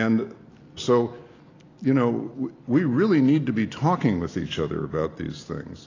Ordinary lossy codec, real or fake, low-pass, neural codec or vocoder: AAC, 32 kbps; real; 7.2 kHz; none